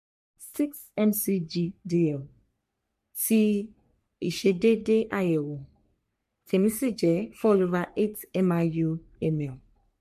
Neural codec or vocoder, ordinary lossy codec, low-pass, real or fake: codec, 44.1 kHz, 3.4 kbps, Pupu-Codec; MP3, 64 kbps; 14.4 kHz; fake